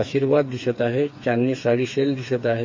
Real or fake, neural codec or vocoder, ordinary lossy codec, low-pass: fake; codec, 16 kHz, 4 kbps, FreqCodec, smaller model; MP3, 32 kbps; 7.2 kHz